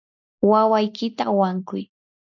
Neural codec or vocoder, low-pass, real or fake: none; 7.2 kHz; real